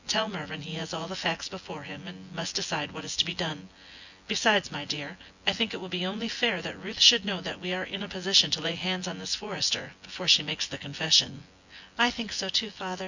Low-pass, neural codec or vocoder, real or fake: 7.2 kHz; vocoder, 24 kHz, 100 mel bands, Vocos; fake